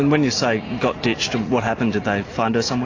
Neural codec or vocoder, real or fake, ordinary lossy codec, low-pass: none; real; AAC, 32 kbps; 7.2 kHz